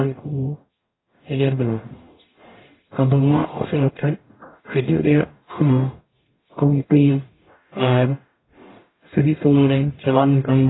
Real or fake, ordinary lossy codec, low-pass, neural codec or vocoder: fake; AAC, 16 kbps; 7.2 kHz; codec, 44.1 kHz, 0.9 kbps, DAC